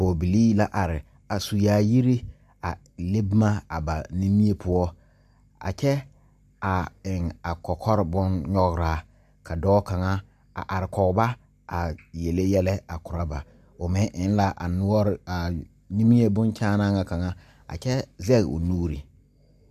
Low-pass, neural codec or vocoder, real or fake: 14.4 kHz; none; real